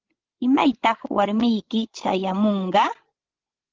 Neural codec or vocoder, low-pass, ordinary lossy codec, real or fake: codec, 16 kHz, 16 kbps, FreqCodec, larger model; 7.2 kHz; Opus, 16 kbps; fake